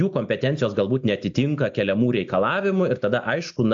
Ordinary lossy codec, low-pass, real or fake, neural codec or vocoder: AAC, 64 kbps; 7.2 kHz; real; none